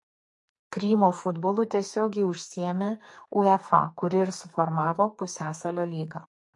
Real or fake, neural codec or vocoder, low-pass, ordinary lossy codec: fake; codec, 44.1 kHz, 2.6 kbps, SNAC; 10.8 kHz; MP3, 48 kbps